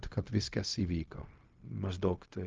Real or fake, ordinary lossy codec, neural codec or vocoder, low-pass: fake; Opus, 24 kbps; codec, 16 kHz, 0.4 kbps, LongCat-Audio-Codec; 7.2 kHz